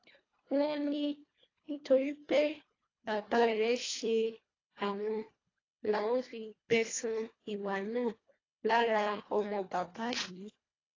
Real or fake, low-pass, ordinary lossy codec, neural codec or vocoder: fake; 7.2 kHz; AAC, 32 kbps; codec, 24 kHz, 1.5 kbps, HILCodec